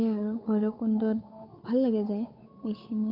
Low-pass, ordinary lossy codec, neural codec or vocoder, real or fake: 5.4 kHz; none; codec, 16 kHz, 8 kbps, FunCodec, trained on Chinese and English, 25 frames a second; fake